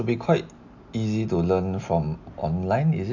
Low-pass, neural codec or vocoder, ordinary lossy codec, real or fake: 7.2 kHz; none; none; real